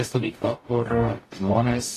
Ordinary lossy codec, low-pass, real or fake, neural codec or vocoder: AAC, 48 kbps; 14.4 kHz; fake; codec, 44.1 kHz, 0.9 kbps, DAC